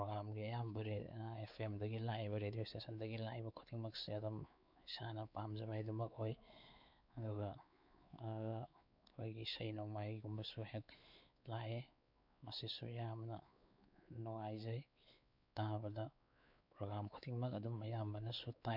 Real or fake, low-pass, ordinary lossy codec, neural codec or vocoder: fake; 5.4 kHz; none; codec, 16 kHz, 4 kbps, X-Codec, WavLM features, trained on Multilingual LibriSpeech